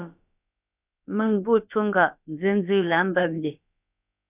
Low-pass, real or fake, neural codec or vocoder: 3.6 kHz; fake; codec, 16 kHz, about 1 kbps, DyCAST, with the encoder's durations